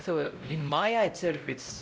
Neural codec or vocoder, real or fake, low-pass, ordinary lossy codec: codec, 16 kHz, 0.5 kbps, X-Codec, WavLM features, trained on Multilingual LibriSpeech; fake; none; none